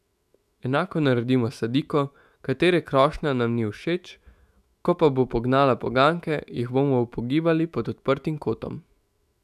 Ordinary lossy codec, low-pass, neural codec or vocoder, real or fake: none; 14.4 kHz; autoencoder, 48 kHz, 128 numbers a frame, DAC-VAE, trained on Japanese speech; fake